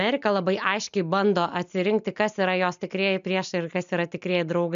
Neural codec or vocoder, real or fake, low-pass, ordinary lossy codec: none; real; 7.2 kHz; MP3, 64 kbps